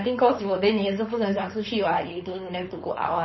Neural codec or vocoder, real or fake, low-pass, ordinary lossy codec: codec, 16 kHz, 4.8 kbps, FACodec; fake; 7.2 kHz; MP3, 24 kbps